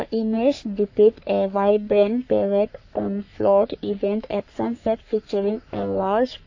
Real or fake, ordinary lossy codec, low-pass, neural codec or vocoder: fake; AAC, 48 kbps; 7.2 kHz; codec, 44.1 kHz, 3.4 kbps, Pupu-Codec